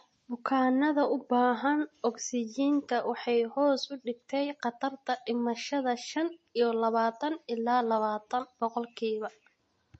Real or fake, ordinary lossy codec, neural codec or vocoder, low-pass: real; MP3, 32 kbps; none; 10.8 kHz